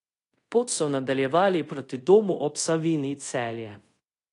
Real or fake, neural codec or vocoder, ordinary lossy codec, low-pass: fake; codec, 24 kHz, 0.5 kbps, DualCodec; AAC, 48 kbps; 10.8 kHz